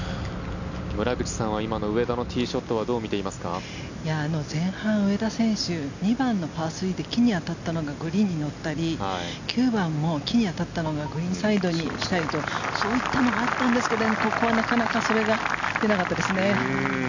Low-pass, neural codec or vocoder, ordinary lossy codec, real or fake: 7.2 kHz; none; none; real